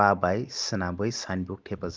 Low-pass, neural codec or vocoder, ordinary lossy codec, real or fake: 7.2 kHz; none; Opus, 24 kbps; real